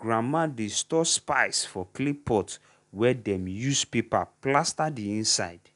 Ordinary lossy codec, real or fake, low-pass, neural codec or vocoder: none; real; 10.8 kHz; none